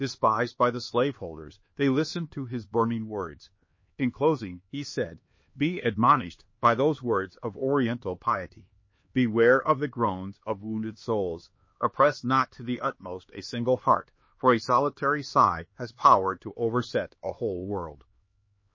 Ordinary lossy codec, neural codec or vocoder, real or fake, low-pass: MP3, 32 kbps; codec, 16 kHz, 2 kbps, X-Codec, HuBERT features, trained on LibriSpeech; fake; 7.2 kHz